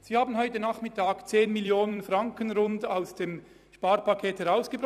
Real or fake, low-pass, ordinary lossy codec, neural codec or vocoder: fake; 14.4 kHz; none; vocoder, 44.1 kHz, 128 mel bands every 256 samples, BigVGAN v2